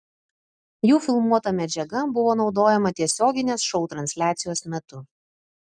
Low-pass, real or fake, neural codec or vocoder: 9.9 kHz; real; none